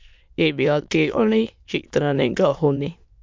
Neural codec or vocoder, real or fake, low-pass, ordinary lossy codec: autoencoder, 22.05 kHz, a latent of 192 numbers a frame, VITS, trained on many speakers; fake; 7.2 kHz; MP3, 64 kbps